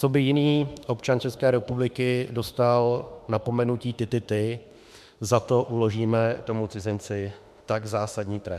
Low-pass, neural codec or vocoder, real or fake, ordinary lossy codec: 14.4 kHz; autoencoder, 48 kHz, 32 numbers a frame, DAC-VAE, trained on Japanese speech; fake; MP3, 96 kbps